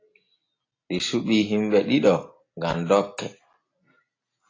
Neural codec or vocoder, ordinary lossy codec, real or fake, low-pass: none; AAC, 32 kbps; real; 7.2 kHz